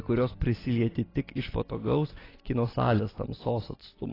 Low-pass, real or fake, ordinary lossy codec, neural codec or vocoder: 5.4 kHz; real; AAC, 24 kbps; none